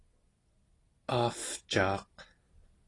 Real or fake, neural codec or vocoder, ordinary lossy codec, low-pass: real; none; AAC, 32 kbps; 10.8 kHz